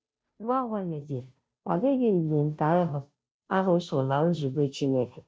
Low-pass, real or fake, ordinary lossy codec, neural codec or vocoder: none; fake; none; codec, 16 kHz, 0.5 kbps, FunCodec, trained on Chinese and English, 25 frames a second